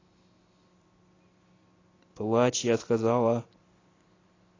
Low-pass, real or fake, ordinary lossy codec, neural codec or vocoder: 7.2 kHz; real; AAC, 32 kbps; none